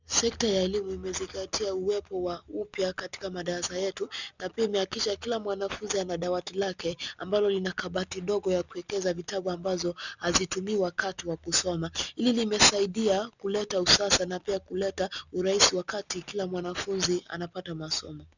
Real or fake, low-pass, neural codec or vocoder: real; 7.2 kHz; none